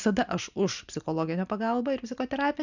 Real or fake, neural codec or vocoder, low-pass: real; none; 7.2 kHz